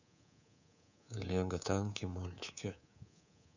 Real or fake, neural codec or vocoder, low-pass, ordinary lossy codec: fake; codec, 24 kHz, 3.1 kbps, DualCodec; 7.2 kHz; none